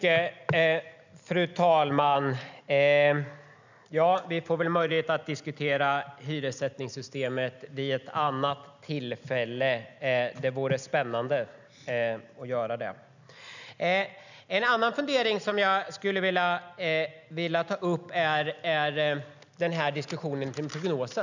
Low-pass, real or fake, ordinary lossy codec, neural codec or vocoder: 7.2 kHz; real; none; none